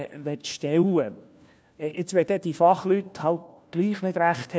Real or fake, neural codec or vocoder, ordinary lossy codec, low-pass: fake; codec, 16 kHz, 1 kbps, FunCodec, trained on LibriTTS, 50 frames a second; none; none